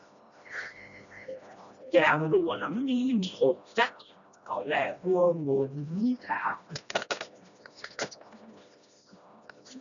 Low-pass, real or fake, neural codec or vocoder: 7.2 kHz; fake; codec, 16 kHz, 1 kbps, FreqCodec, smaller model